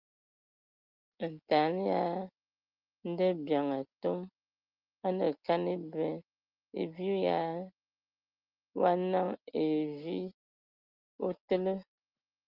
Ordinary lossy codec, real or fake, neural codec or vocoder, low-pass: Opus, 24 kbps; real; none; 5.4 kHz